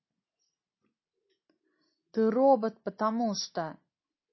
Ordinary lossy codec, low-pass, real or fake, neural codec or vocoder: MP3, 24 kbps; 7.2 kHz; real; none